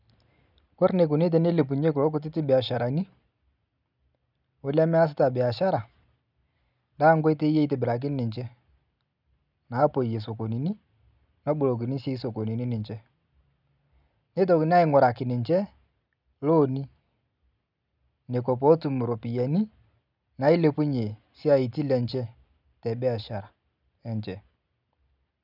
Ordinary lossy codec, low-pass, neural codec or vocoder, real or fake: none; 5.4 kHz; none; real